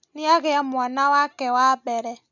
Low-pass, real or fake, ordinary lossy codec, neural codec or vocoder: 7.2 kHz; real; none; none